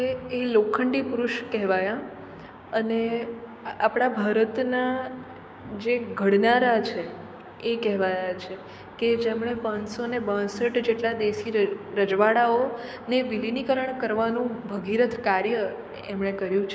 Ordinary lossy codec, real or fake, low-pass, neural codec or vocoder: none; fake; none; codec, 16 kHz, 6 kbps, DAC